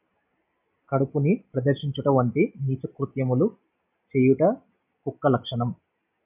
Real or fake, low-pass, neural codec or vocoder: real; 3.6 kHz; none